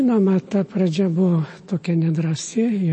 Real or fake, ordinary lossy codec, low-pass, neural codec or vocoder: fake; MP3, 32 kbps; 10.8 kHz; autoencoder, 48 kHz, 128 numbers a frame, DAC-VAE, trained on Japanese speech